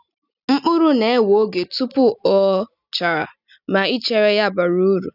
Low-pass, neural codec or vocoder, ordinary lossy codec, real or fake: 5.4 kHz; none; none; real